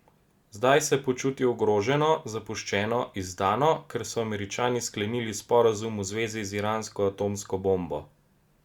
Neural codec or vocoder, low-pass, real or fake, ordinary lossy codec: none; 19.8 kHz; real; Opus, 64 kbps